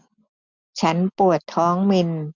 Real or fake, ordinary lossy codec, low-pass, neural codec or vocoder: real; none; 7.2 kHz; none